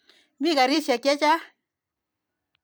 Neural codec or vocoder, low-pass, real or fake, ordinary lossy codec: vocoder, 44.1 kHz, 128 mel bands every 256 samples, BigVGAN v2; none; fake; none